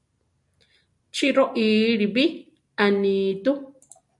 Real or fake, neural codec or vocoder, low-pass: real; none; 10.8 kHz